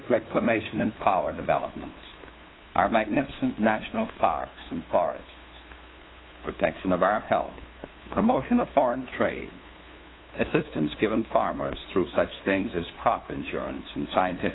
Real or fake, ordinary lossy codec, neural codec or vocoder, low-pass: fake; AAC, 16 kbps; codec, 16 kHz, 2 kbps, FunCodec, trained on LibriTTS, 25 frames a second; 7.2 kHz